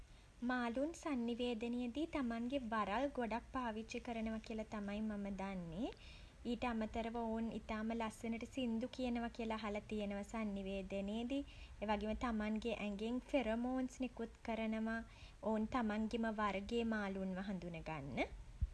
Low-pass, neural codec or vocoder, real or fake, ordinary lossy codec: none; none; real; none